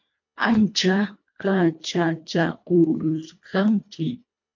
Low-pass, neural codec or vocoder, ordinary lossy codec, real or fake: 7.2 kHz; codec, 24 kHz, 1.5 kbps, HILCodec; MP3, 48 kbps; fake